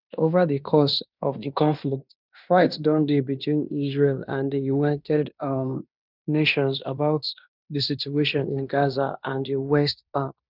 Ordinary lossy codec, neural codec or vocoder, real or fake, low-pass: none; codec, 16 kHz in and 24 kHz out, 0.9 kbps, LongCat-Audio-Codec, fine tuned four codebook decoder; fake; 5.4 kHz